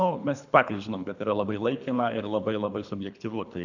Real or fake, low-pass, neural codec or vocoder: fake; 7.2 kHz; codec, 24 kHz, 3 kbps, HILCodec